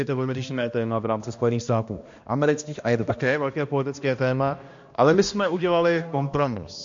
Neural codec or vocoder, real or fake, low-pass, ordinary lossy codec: codec, 16 kHz, 1 kbps, X-Codec, HuBERT features, trained on balanced general audio; fake; 7.2 kHz; MP3, 48 kbps